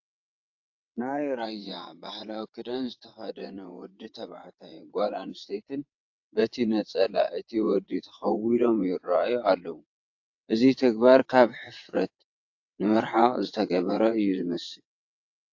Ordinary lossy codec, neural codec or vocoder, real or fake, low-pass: AAC, 48 kbps; vocoder, 22.05 kHz, 80 mel bands, WaveNeXt; fake; 7.2 kHz